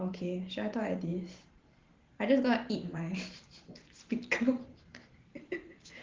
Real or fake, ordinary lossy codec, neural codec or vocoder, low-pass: fake; Opus, 16 kbps; vocoder, 44.1 kHz, 128 mel bands every 512 samples, BigVGAN v2; 7.2 kHz